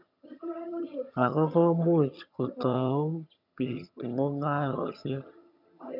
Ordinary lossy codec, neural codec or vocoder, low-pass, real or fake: none; vocoder, 22.05 kHz, 80 mel bands, HiFi-GAN; 5.4 kHz; fake